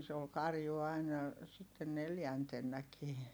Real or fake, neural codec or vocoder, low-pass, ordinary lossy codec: real; none; none; none